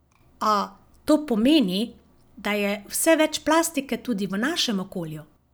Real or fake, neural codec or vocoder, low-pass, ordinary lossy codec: real; none; none; none